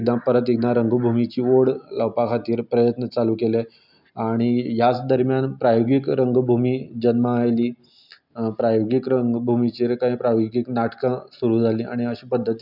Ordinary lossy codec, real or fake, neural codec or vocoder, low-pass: none; real; none; 5.4 kHz